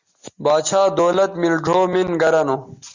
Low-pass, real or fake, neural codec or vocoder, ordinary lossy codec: 7.2 kHz; real; none; Opus, 64 kbps